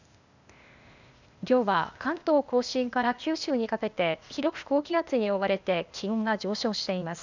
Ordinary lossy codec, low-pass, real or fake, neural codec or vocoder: none; 7.2 kHz; fake; codec, 16 kHz, 0.8 kbps, ZipCodec